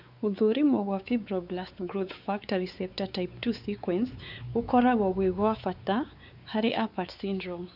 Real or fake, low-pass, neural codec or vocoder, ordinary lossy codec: fake; 5.4 kHz; codec, 16 kHz, 4 kbps, X-Codec, WavLM features, trained on Multilingual LibriSpeech; none